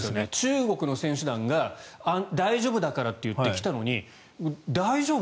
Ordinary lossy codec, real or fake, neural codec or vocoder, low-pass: none; real; none; none